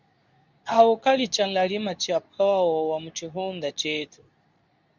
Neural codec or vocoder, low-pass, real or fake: codec, 24 kHz, 0.9 kbps, WavTokenizer, medium speech release version 2; 7.2 kHz; fake